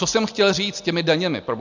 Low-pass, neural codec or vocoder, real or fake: 7.2 kHz; none; real